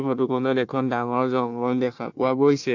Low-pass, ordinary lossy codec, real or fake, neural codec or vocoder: 7.2 kHz; AAC, 48 kbps; fake; codec, 16 kHz, 1 kbps, FunCodec, trained on Chinese and English, 50 frames a second